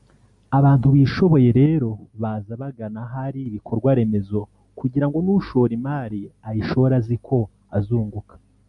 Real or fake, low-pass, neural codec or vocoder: real; 10.8 kHz; none